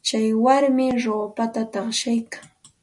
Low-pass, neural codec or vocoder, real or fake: 10.8 kHz; none; real